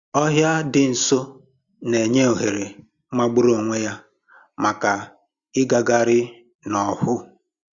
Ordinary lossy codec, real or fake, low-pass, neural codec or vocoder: Opus, 64 kbps; real; 7.2 kHz; none